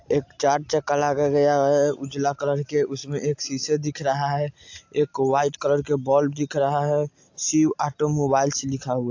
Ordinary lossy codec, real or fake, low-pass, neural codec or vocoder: AAC, 48 kbps; real; 7.2 kHz; none